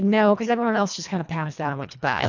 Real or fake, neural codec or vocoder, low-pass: fake; codec, 24 kHz, 1.5 kbps, HILCodec; 7.2 kHz